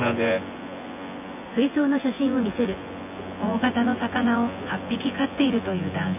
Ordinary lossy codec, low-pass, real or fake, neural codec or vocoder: AAC, 32 kbps; 3.6 kHz; fake; vocoder, 24 kHz, 100 mel bands, Vocos